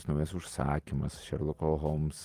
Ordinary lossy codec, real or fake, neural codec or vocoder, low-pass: Opus, 24 kbps; fake; vocoder, 44.1 kHz, 128 mel bands every 256 samples, BigVGAN v2; 14.4 kHz